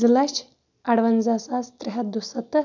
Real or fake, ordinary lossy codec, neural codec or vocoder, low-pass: real; none; none; 7.2 kHz